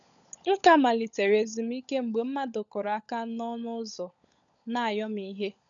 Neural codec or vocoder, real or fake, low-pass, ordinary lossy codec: codec, 16 kHz, 16 kbps, FunCodec, trained on LibriTTS, 50 frames a second; fake; 7.2 kHz; none